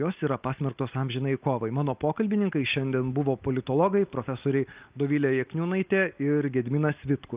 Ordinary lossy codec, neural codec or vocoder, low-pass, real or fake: Opus, 24 kbps; none; 3.6 kHz; real